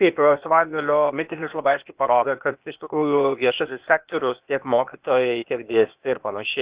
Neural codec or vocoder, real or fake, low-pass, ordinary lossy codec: codec, 16 kHz, 0.8 kbps, ZipCodec; fake; 3.6 kHz; Opus, 64 kbps